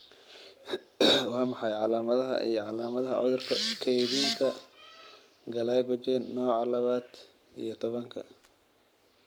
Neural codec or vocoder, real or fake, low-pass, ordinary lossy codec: codec, 44.1 kHz, 7.8 kbps, Pupu-Codec; fake; none; none